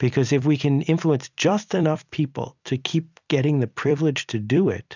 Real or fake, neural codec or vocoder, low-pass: fake; vocoder, 44.1 kHz, 128 mel bands every 256 samples, BigVGAN v2; 7.2 kHz